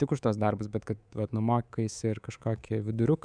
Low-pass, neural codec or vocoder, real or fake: 9.9 kHz; codec, 24 kHz, 3.1 kbps, DualCodec; fake